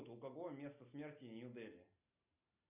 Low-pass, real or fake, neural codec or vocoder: 3.6 kHz; real; none